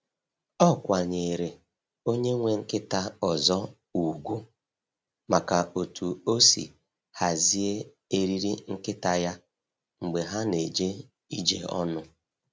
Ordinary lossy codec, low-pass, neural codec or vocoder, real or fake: none; none; none; real